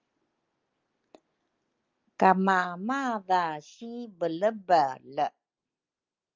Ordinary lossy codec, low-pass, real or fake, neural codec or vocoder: Opus, 32 kbps; 7.2 kHz; real; none